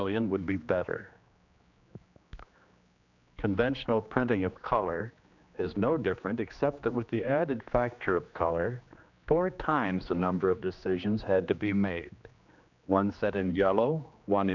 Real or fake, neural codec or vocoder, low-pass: fake; codec, 16 kHz, 2 kbps, X-Codec, HuBERT features, trained on general audio; 7.2 kHz